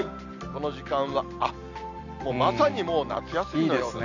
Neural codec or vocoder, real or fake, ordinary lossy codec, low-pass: none; real; none; 7.2 kHz